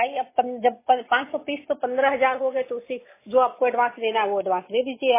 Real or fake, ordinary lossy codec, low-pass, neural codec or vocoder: real; MP3, 16 kbps; 3.6 kHz; none